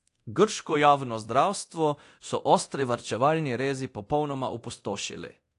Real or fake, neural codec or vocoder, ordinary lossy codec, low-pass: fake; codec, 24 kHz, 0.9 kbps, DualCodec; AAC, 48 kbps; 10.8 kHz